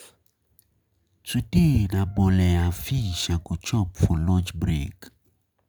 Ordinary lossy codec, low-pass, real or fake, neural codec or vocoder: none; none; real; none